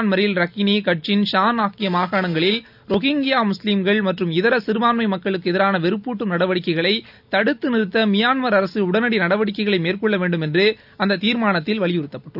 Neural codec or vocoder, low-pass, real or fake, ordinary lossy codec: none; 5.4 kHz; real; none